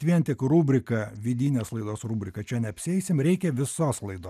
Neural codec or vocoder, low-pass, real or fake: none; 14.4 kHz; real